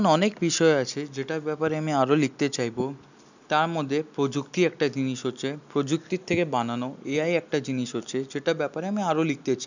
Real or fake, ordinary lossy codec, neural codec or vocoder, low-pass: real; none; none; 7.2 kHz